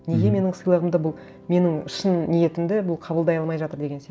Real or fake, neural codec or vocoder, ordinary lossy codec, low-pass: real; none; none; none